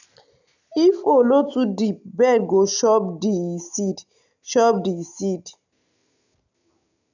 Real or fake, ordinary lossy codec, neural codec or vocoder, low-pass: real; none; none; 7.2 kHz